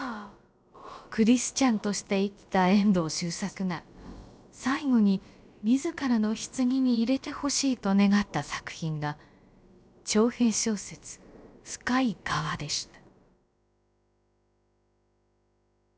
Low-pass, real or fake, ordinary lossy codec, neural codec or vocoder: none; fake; none; codec, 16 kHz, about 1 kbps, DyCAST, with the encoder's durations